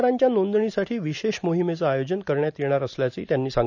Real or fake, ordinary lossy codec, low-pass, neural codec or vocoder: real; none; 7.2 kHz; none